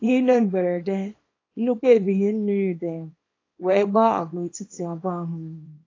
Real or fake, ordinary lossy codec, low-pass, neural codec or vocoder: fake; AAC, 32 kbps; 7.2 kHz; codec, 24 kHz, 0.9 kbps, WavTokenizer, small release